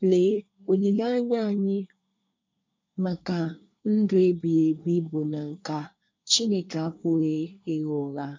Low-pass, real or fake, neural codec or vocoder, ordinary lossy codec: 7.2 kHz; fake; codec, 24 kHz, 1 kbps, SNAC; MP3, 64 kbps